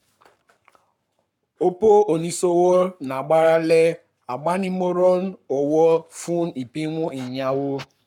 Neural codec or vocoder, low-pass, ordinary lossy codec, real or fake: codec, 44.1 kHz, 7.8 kbps, Pupu-Codec; 19.8 kHz; none; fake